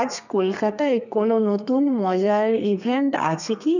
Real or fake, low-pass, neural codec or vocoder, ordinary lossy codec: fake; 7.2 kHz; codec, 32 kHz, 1.9 kbps, SNAC; none